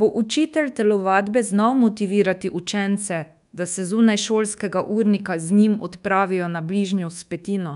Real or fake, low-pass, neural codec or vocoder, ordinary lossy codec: fake; 10.8 kHz; codec, 24 kHz, 1.2 kbps, DualCodec; none